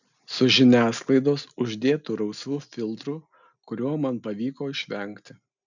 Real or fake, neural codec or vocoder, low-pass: real; none; 7.2 kHz